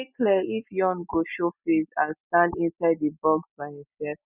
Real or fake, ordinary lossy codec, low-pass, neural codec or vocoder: real; none; 3.6 kHz; none